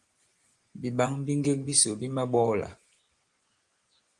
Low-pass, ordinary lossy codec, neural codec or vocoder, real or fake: 10.8 kHz; Opus, 24 kbps; vocoder, 24 kHz, 100 mel bands, Vocos; fake